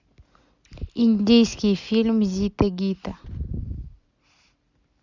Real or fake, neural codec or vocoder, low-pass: real; none; 7.2 kHz